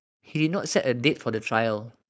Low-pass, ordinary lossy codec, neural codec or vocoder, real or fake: none; none; codec, 16 kHz, 4.8 kbps, FACodec; fake